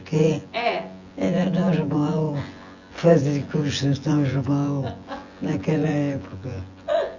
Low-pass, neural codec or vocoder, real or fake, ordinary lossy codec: 7.2 kHz; vocoder, 24 kHz, 100 mel bands, Vocos; fake; Opus, 64 kbps